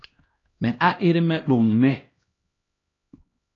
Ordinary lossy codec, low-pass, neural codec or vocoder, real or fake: AAC, 32 kbps; 7.2 kHz; codec, 16 kHz, 1 kbps, X-Codec, HuBERT features, trained on LibriSpeech; fake